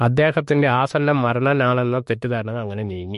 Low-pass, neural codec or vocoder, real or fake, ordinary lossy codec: 14.4 kHz; autoencoder, 48 kHz, 32 numbers a frame, DAC-VAE, trained on Japanese speech; fake; MP3, 48 kbps